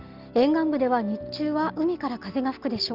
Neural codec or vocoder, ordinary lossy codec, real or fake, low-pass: none; Opus, 24 kbps; real; 5.4 kHz